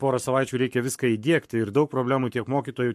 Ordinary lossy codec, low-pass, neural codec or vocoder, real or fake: MP3, 64 kbps; 14.4 kHz; codec, 44.1 kHz, 7.8 kbps, Pupu-Codec; fake